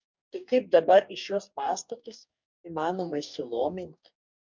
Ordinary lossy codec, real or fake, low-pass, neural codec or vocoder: MP3, 64 kbps; fake; 7.2 kHz; codec, 44.1 kHz, 2.6 kbps, DAC